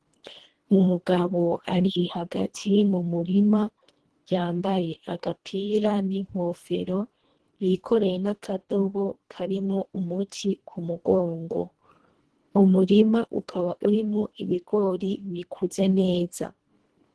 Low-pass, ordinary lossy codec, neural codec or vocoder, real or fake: 10.8 kHz; Opus, 16 kbps; codec, 24 kHz, 1.5 kbps, HILCodec; fake